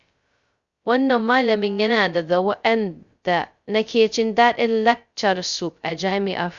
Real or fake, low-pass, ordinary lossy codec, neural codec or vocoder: fake; 7.2 kHz; Opus, 64 kbps; codec, 16 kHz, 0.2 kbps, FocalCodec